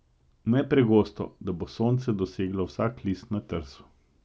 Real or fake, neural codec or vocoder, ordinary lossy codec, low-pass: real; none; none; none